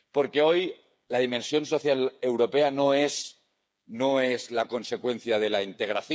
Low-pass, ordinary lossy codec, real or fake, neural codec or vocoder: none; none; fake; codec, 16 kHz, 8 kbps, FreqCodec, smaller model